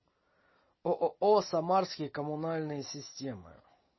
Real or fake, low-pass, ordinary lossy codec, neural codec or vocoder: real; 7.2 kHz; MP3, 24 kbps; none